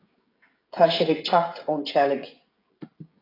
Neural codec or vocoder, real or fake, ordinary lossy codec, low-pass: codec, 16 kHz, 16 kbps, FreqCodec, smaller model; fake; AAC, 24 kbps; 5.4 kHz